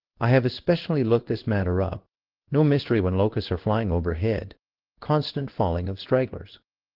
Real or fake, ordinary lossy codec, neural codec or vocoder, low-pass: fake; Opus, 16 kbps; codec, 16 kHz in and 24 kHz out, 1 kbps, XY-Tokenizer; 5.4 kHz